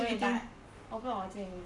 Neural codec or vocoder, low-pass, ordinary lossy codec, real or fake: codec, 44.1 kHz, 7.8 kbps, Pupu-Codec; 10.8 kHz; none; fake